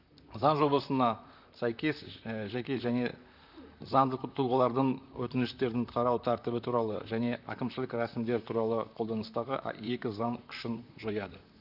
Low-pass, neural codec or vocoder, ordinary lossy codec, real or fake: 5.4 kHz; vocoder, 44.1 kHz, 128 mel bands, Pupu-Vocoder; none; fake